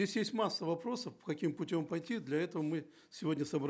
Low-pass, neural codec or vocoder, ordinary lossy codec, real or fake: none; none; none; real